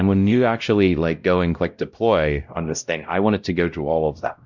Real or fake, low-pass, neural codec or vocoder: fake; 7.2 kHz; codec, 16 kHz, 0.5 kbps, X-Codec, WavLM features, trained on Multilingual LibriSpeech